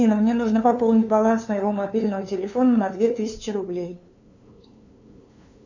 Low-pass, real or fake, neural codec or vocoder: 7.2 kHz; fake; codec, 16 kHz, 2 kbps, FunCodec, trained on LibriTTS, 25 frames a second